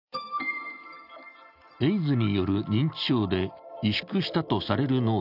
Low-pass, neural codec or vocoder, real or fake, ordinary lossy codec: 5.4 kHz; none; real; none